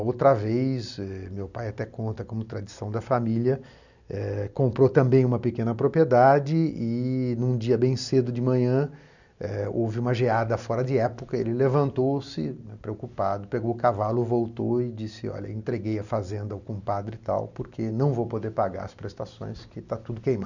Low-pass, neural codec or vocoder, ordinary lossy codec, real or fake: 7.2 kHz; none; none; real